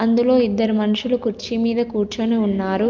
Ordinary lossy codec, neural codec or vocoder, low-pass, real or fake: Opus, 24 kbps; none; 7.2 kHz; real